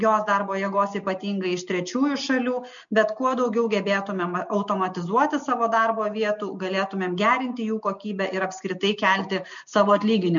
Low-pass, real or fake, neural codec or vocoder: 7.2 kHz; real; none